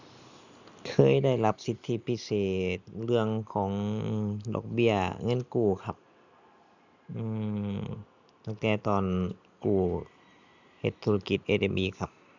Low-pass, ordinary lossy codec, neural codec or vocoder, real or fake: 7.2 kHz; none; none; real